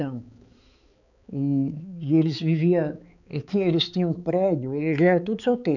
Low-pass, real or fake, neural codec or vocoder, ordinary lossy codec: 7.2 kHz; fake; codec, 16 kHz, 4 kbps, X-Codec, HuBERT features, trained on balanced general audio; none